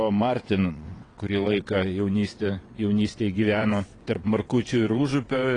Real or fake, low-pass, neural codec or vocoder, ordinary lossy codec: fake; 9.9 kHz; vocoder, 22.05 kHz, 80 mel bands, WaveNeXt; AAC, 32 kbps